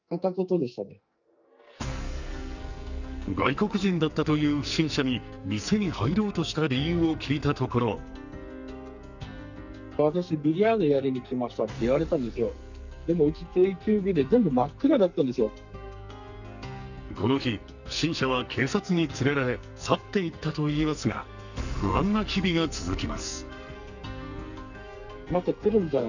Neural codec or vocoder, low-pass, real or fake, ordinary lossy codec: codec, 44.1 kHz, 2.6 kbps, SNAC; 7.2 kHz; fake; none